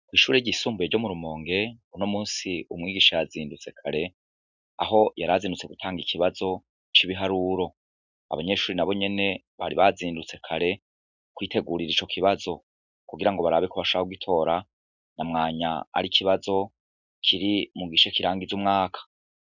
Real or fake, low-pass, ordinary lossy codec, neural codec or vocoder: real; 7.2 kHz; Opus, 64 kbps; none